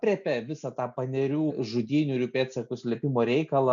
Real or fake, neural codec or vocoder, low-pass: real; none; 7.2 kHz